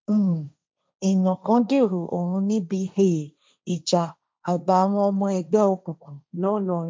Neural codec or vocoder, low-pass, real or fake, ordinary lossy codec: codec, 16 kHz, 1.1 kbps, Voila-Tokenizer; none; fake; none